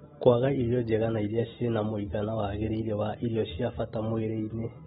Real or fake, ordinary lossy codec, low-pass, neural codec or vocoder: real; AAC, 16 kbps; 10.8 kHz; none